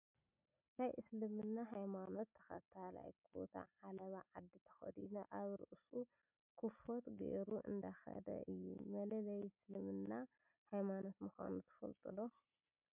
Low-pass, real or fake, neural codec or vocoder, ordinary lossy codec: 3.6 kHz; real; none; MP3, 32 kbps